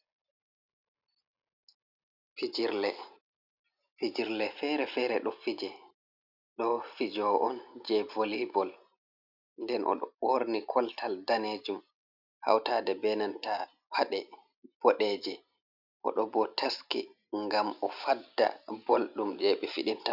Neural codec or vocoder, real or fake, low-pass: none; real; 5.4 kHz